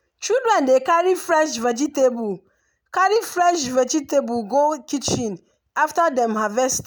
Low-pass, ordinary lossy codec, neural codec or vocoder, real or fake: none; none; none; real